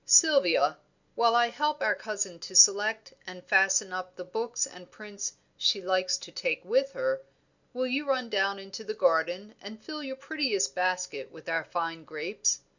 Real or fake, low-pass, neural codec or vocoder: real; 7.2 kHz; none